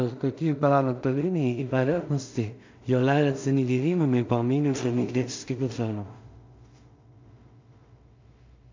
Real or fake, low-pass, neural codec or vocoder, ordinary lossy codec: fake; 7.2 kHz; codec, 16 kHz in and 24 kHz out, 0.4 kbps, LongCat-Audio-Codec, two codebook decoder; MP3, 64 kbps